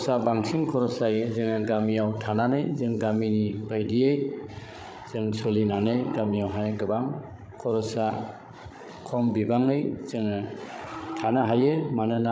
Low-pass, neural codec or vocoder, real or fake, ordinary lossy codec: none; codec, 16 kHz, 16 kbps, FreqCodec, larger model; fake; none